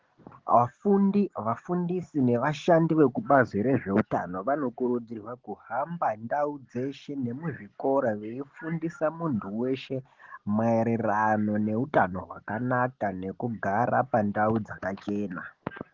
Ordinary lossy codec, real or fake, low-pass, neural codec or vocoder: Opus, 16 kbps; real; 7.2 kHz; none